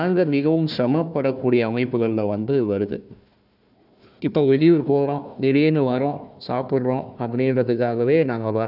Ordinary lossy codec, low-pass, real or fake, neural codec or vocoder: none; 5.4 kHz; fake; codec, 16 kHz, 1 kbps, FunCodec, trained on Chinese and English, 50 frames a second